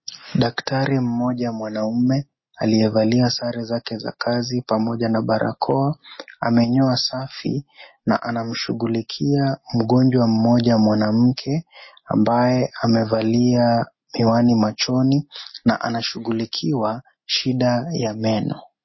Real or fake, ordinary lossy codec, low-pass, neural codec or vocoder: real; MP3, 24 kbps; 7.2 kHz; none